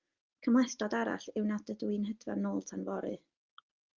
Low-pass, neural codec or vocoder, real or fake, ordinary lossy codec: 7.2 kHz; none; real; Opus, 32 kbps